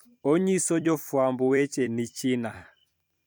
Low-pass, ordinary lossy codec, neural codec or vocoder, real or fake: none; none; vocoder, 44.1 kHz, 128 mel bands every 256 samples, BigVGAN v2; fake